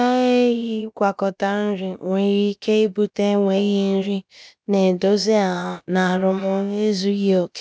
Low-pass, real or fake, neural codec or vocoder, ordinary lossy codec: none; fake; codec, 16 kHz, about 1 kbps, DyCAST, with the encoder's durations; none